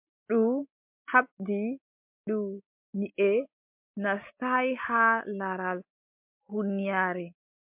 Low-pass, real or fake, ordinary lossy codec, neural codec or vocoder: 3.6 kHz; real; MP3, 32 kbps; none